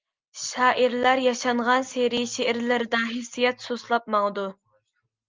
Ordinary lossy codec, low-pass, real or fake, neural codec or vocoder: Opus, 32 kbps; 7.2 kHz; real; none